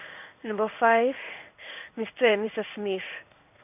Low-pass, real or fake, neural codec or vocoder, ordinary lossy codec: 3.6 kHz; fake; codec, 16 kHz in and 24 kHz out, 1 kbps, XY-Tokenizer; none